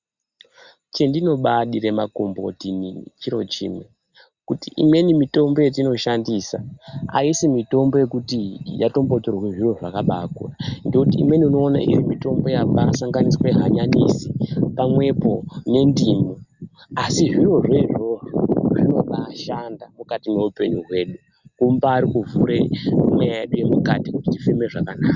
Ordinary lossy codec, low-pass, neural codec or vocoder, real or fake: Opus, 64 kbps; 7.2 kHz; none; real